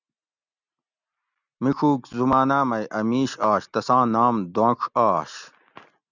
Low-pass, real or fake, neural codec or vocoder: 7.2 kHz; real; none